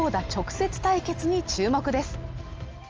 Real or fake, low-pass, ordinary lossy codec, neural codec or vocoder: real; 7.2 kHz; Opus, 24 kbps; none